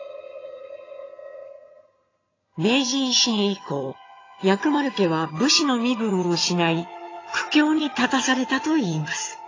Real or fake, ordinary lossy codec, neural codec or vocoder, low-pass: fake; AAC, 32 kbps; vocoder, 22.05 kHz, 80 mel bands, HiFi-GAN; 7.2 kHz